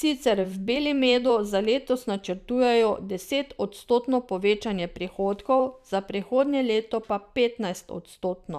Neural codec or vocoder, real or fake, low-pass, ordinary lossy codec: vocoder, 44.1 kHz, 128 mel bands every 512 samples, BigVGAN v2; fake; 14.4 kHz; none